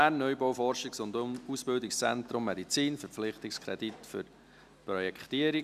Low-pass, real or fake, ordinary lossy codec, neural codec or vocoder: 14.4 kHz; real; none; none